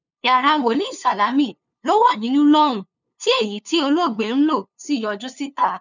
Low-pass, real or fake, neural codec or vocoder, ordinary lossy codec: 7.2 kHz; fake; codec, 16 kHz, 2 kbps, FunCodec, trained on LibriTTS, 25 frames a second; none